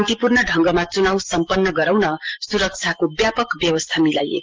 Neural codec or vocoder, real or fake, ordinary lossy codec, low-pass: none; real; Opus, 16 kbps; 7.2 kHz